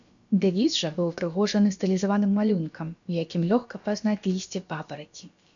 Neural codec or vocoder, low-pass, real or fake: codec, 16 kHz, about 1 kbps, DyCAST, with the encoder's durations; 7.2 kHz; fake